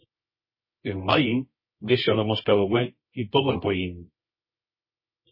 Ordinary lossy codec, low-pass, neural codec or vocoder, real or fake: MP3, 24 kbps; 5.4 kHz; codec, 24 kHz, 0.9 kbps, WavTokenizer, medium music audio release; fake